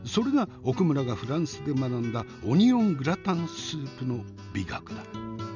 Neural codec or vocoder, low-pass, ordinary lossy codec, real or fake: none; 7.2 kHz; none; real